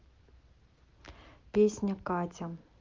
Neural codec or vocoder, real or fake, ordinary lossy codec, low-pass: none; real; Opus, 32 kbps; 7.2 kHz